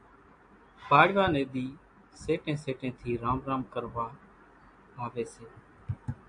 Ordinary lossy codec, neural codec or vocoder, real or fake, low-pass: AAC, 64 kbps; none; real; 9.9 kHz